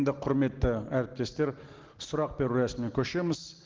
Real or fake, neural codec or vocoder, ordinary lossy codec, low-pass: real; none; Opus, 16 kbps; 7.2 kHz